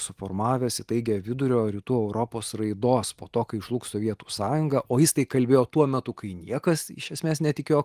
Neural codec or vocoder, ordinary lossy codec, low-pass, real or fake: none; Opus, 24 kbps; 14.4 kHz; real